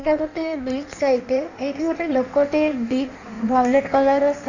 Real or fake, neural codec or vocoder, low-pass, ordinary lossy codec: fake; codec, 16 kHz in and 24 kHz out, 1.1 kbps, FireRedTTS-2 codec; 7.2 kHz; none